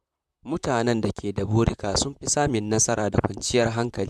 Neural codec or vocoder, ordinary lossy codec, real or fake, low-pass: vocoder, 44.1 kHz, 128 mel bands, Pupu-Vocoder; none; fake; 14.4 kHz